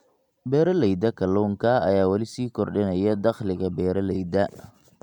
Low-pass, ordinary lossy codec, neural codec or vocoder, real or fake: 19.8 kHz; MP3, 96 kbps; none; real